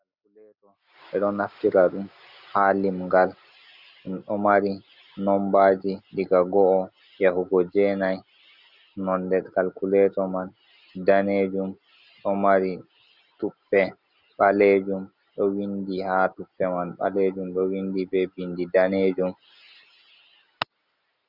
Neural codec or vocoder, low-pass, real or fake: none; 5.4 kHz; real